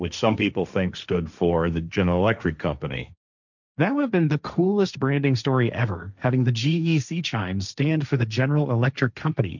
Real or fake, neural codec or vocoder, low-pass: fake; codec, 16 kHz, 1.1 kbps, Voila-Tokenizer; 7.2 kHz